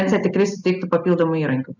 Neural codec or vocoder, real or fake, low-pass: none; real; 7.2 kHz